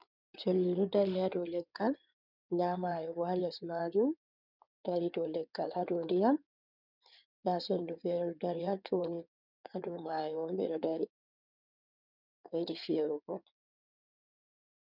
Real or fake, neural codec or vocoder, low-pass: fake; codec, 16 kHz in and 24 kHz out, 2.2 kbps, FireRedTTS-2 codec; 5.4 kHz